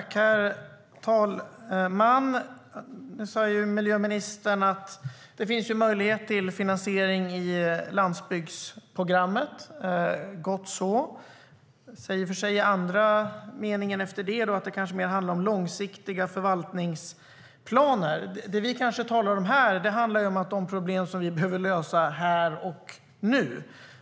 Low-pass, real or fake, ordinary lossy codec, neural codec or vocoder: none; real; none; none